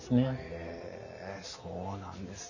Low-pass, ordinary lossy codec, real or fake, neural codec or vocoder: 7.2 kHz; none; real; none